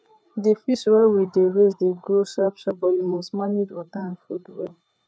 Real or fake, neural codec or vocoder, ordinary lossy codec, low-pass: fake; codec, 16 kHz, 4 kbps, FreqCodec, larger model; none; none